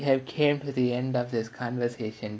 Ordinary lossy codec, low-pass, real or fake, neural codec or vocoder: none; none; real; none